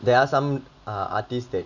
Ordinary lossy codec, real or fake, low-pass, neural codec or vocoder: none; real; 7.2 kHz; none